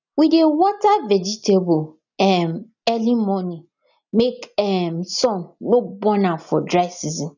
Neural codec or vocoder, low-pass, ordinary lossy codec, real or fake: none; 7.2 kHz; none; real